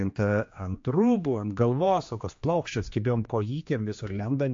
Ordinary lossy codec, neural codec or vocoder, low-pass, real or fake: MP3, 48 kbps; codec, 16 kHz, 2 kbps, X-Codec, HuBERT features, trained on general audio; 7.2 kHz; fake